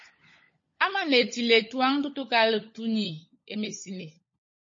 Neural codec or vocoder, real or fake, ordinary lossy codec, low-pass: codec, 16 kHz, 16 kbps, FunCodec, trained on LibriTTS, 50 frames a second; fake; MP3, 32 kbps; 7.2 kHz